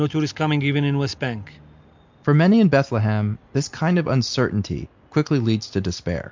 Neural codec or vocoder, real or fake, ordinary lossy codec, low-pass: none; real; MP3, 64 kbps; 7.2 kHz